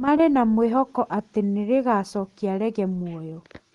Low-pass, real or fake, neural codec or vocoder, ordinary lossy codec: 10.8 kHz; real; none; Opus, 24 kbps